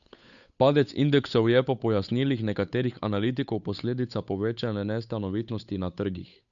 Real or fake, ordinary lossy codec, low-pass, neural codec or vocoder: fake; none; 7.2 kHz; codec, 16 kHz, 8 kbps, FunCodec, trained on Chinese and English, 25 frames a second